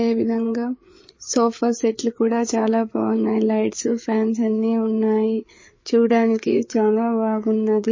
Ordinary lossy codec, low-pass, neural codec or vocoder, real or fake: MP3, 32 kbps; 7.2 kHz; codec, 16 kHz, 4 kbps, FreqCodec, larger model; fake